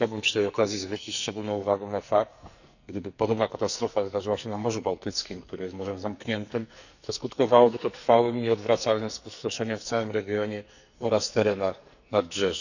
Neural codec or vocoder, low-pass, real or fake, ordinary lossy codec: codec, 44.1 kHz, 2.6 kbps, SNAC; 7.2 kHz; fake; none